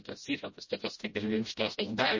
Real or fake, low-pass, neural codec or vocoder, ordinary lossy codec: fake; 7.2 kHz; codec, 16 kHz, 0.5 kbps, FreqCodec, smaller model; MP3, 32 kbps